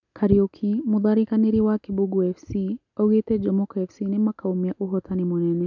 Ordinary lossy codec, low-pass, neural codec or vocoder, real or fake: none; 7.2 kHz; none; real